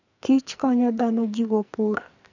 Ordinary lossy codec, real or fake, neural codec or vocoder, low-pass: none; fake; codec, 44.1 kHz, 2.6 kbps, SNAC; 7.2 kHz